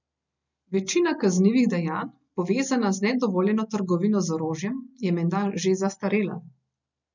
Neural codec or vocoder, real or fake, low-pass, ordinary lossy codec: none; real; 7.2 kHz; none